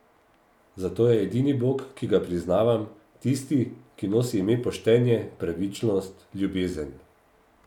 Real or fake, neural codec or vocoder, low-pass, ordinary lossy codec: real; none; 19.8 kHz; none